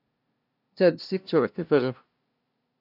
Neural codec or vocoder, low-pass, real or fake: codec, 16 kHz, 0.5 kbps, FunCodec, trained on LibriTTS, 25 frames a second; 5.4 kHz; fake